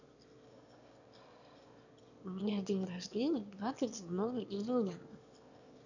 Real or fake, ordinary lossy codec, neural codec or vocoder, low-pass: fake; none; autoencoder, 22.05 kHz, a latent of 192 numbers a frame, VITS, trained on one speaker; 7.2 kHz